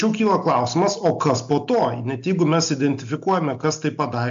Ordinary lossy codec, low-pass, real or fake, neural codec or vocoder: AAC, 64 kbps; 7.2 kHz; real; none